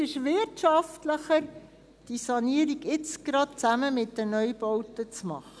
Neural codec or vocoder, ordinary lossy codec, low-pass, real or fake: none; none; none; real